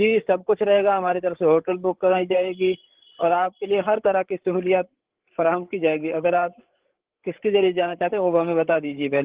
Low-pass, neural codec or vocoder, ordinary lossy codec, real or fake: 3.6 kHz; codec, 16 kHz, 8 kbps, FreqCodec, larger model; Opus, 16 kbps; fake